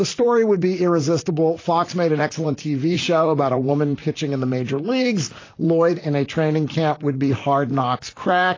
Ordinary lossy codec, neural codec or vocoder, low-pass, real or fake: AAC, 32 kbps; vocoder, 44.1 kHz, 128 mel bands, Pupu-Vocoder; 7.2 kHz; fake